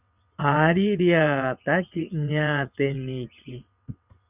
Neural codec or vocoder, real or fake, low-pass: vocoder, 22.05 kHz, 80 mel bands, WaveNeXt; fake; 3.6 kHz